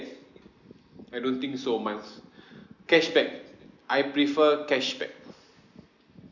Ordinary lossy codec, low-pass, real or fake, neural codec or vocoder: none; 7.2 kHz; real; none